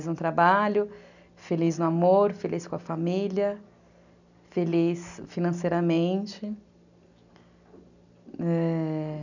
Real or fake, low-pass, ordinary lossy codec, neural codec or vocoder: real; 7.2 kHz; none; none